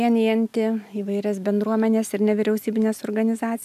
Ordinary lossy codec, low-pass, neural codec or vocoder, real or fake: AAC, 96 kbps; 14.4 kHz; none; real